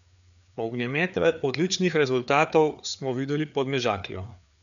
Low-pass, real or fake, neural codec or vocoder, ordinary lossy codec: 7.2 kHz; fake; codec, 16 kHz, 4 kbps, FreqCodec, larger model; MP3, 96 kbps